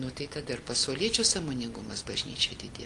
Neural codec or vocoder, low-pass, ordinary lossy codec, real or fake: none; 10.8 kHz; Opus, 32 kbps; real